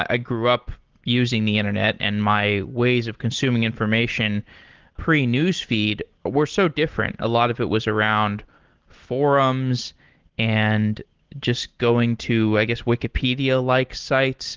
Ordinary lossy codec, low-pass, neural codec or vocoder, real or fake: Opus, 16 kbps; 7.2 kHz; none; real